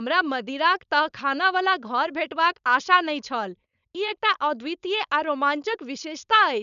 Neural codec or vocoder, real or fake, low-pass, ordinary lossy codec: codec, 16 kHz, 4.8 kbps, FACodec; fake; 7.2 kHz; none